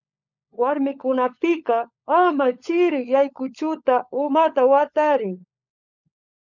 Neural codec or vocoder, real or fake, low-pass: codec, 16 kHz, 16 kbps, FunCodec, trained on LibriTTS, 50 frames a second; fake; 7.2 kHz